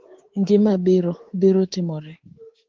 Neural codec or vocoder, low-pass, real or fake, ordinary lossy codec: codec, 24 kHz, 3.1 kbps, DualCodec; 7.2 kHz; fake; Opus, 16 kbps